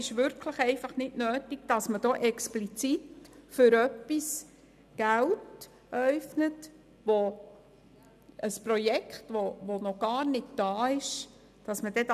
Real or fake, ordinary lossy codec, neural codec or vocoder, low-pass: real; none; none; 14.4 kHz